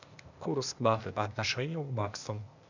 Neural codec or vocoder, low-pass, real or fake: codec, 16 kHz, 0.8 kbps, ZipCodec; 7.2 kHz; fake